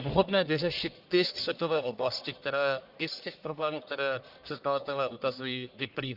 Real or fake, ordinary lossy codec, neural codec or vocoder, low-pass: fake; Opus, 64 kbps; codec, 44.1 kHz, 1.7 kbps, Pupu-Codec; 5.4 kHz